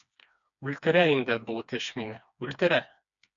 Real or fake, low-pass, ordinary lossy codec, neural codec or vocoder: fake; 7.2 kHz; MP3, 96 kbps; codec, 16 kHz, 2 kbps, FreqCodec, smaller model